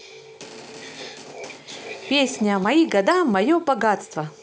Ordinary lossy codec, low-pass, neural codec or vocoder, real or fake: none; none; none; real